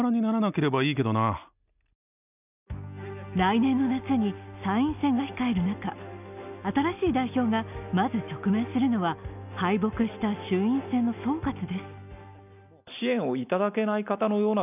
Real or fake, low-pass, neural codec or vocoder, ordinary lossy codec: real; 3.6 kHz; none; none